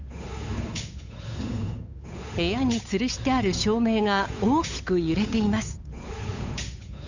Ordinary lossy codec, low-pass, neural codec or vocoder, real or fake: Opus, 64 kbps; 7.2 kHz; codec, 16 kHz, 8 kbps, FunCodec, trained on Chinese and English, 25 frames a second; fake